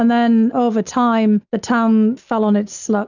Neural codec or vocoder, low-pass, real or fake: none; 7.2 kHz; real